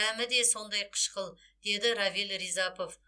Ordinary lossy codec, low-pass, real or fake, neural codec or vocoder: none; none; real; none